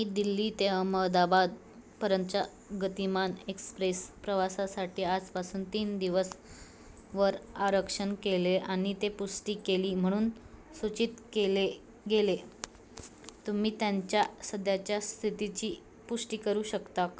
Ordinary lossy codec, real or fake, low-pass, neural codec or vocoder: none; real; none; none